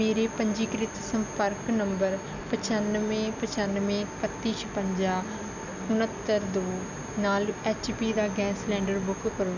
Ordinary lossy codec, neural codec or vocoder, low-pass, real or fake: none; none; 7.2 kHz; real